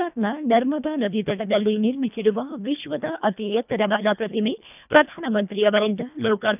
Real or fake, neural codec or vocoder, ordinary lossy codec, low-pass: fake; codec, 24 kHz, 1.5 kbps, HILCodec; none; 3.6 kHz